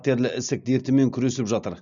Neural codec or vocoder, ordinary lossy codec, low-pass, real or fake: none; none; 7.2 kHz; real